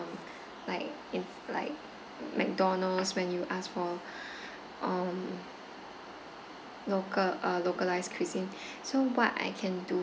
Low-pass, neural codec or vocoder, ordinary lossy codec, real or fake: none; none; none; real